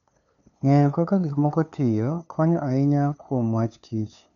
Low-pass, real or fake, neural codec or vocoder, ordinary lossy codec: 7.2 kHz; fake; codec, 16 kHz, 2 kbps, FunCodec, trained on LibriTTS, 25 frames a second; none